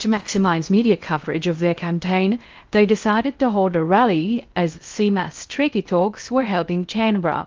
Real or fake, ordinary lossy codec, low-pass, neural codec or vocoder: fake; Opus, 24 kbps; 7.2 kHz; codec, 16 kHz in and 24 kHz out, 0.6 kbps, FocalCodec, streaming, 2048 codes